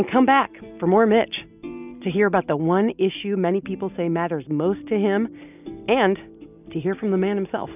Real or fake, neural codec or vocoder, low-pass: real; none; 3.6 kHz